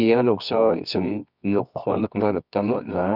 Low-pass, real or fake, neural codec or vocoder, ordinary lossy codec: 5.4 kHz; fake; codec, 24 kHz, 0.9 kbps, WavTokenizer, medium music audio release; none